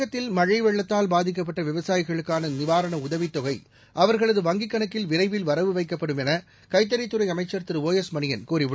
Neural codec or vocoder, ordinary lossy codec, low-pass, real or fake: none; none; none; real